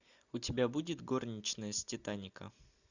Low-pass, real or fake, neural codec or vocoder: 7.2 kHz; real; none